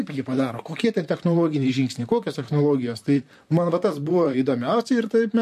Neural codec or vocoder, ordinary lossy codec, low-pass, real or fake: vocoder, 44.1 kHz, 128 mel bands, Pupu-Vocoder; MP3, 64 kbps; 14.4 kHz; fake